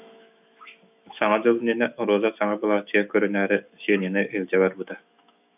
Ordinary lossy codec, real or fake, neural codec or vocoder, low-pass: AAC, 32 kbps; fake; autoencoder, 48 kHz, 128 numbers a frame, DAC-VAE, trained on Japanese speech; 3.6 kHz